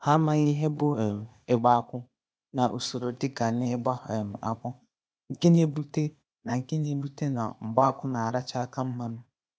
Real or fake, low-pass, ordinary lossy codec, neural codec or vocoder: fake; none; none; codec, 16 kHz, 0.8 kbps, ZipCodec